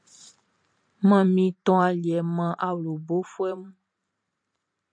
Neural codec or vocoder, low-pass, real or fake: vocoder, 44.1 kHz, 128 mel bands every 512 samples, BigVGAN v2; 9.9 kHz; fake